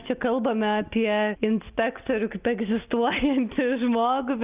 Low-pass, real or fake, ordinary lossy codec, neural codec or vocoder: 3.6 kHz; real; Opus, 24 kbps; none